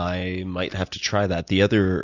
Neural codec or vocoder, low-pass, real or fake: none; 7.2 kHz; real